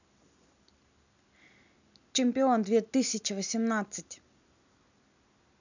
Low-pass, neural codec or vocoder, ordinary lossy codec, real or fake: 7.2 kHz; none; none; real